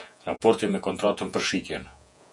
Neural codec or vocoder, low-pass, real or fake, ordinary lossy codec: vocoder, 48 kHz, 128 mel bands, Vocos; 10.8 kHz; fake; MP3, 96 kbps